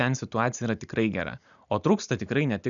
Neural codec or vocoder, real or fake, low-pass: none; real; 7.2 kHz